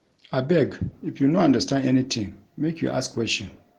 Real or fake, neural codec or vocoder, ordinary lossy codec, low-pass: real; none; Opus, 16 kbps; 19.8 kHz